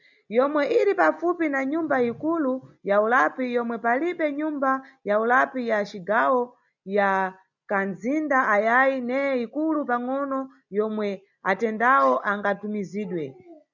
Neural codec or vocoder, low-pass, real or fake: none; 7.2 kHz; real